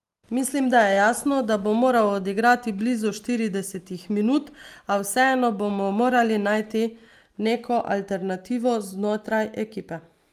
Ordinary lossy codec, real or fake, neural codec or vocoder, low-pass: Opus, 32 kbps; real; none; 14.4 kHz